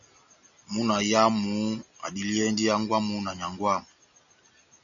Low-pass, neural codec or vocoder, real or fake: 7.2 kHz; none; real